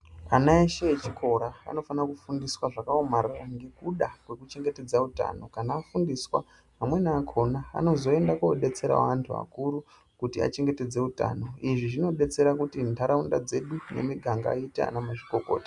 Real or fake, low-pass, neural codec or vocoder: real; 10.8 kHz; none